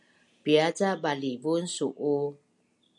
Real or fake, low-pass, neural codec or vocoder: real; 10.8 kHz; none